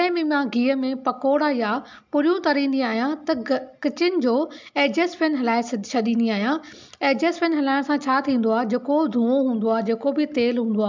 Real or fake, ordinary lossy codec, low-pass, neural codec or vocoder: real; MP3, 64 kbps; 7.2 kHz; none